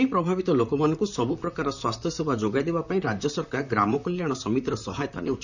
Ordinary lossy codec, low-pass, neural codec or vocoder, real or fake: none; 7.2 kHz; vocoder, 44.1 kHz, 128 mel bands, Pupu-Vocoder; fake